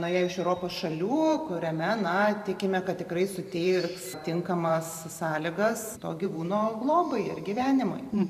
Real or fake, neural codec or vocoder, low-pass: fake; vocoder, 44.1 kHz, 128 mel bands every 512 samples, BigVGAN v2; 14.4 kHz